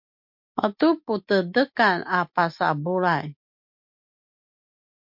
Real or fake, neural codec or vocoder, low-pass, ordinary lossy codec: real; none; 5.4 kHz; MP3, 48 kbps